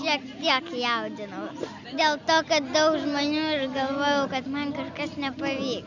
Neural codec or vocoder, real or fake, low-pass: none; real; 7.2 kHz